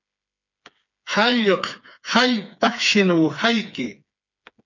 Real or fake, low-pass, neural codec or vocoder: fake; 7.2 kHz; codec, 16 kHz, 4 kbps, FreqCodec, smaller model